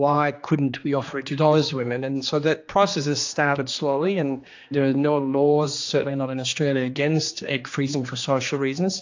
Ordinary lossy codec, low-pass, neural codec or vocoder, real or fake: AAC, 48 kbps; 7.2 kHz; codec, 16 kHz, 2 kbps, X-Codec, HuBERT features, trained on general audio; fake